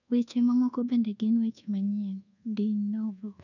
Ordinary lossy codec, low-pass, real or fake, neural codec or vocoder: none; 7.2 kHz; fake; codec, 24 kHz, 1.2 kbps, DualCodec